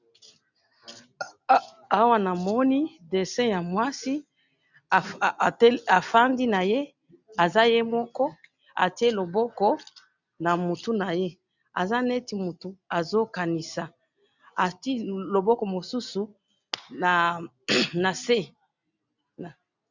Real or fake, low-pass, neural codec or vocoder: real; 7.2 kHz; none